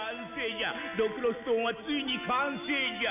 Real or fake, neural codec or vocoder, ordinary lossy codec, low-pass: real; none; none; 3.6 kHz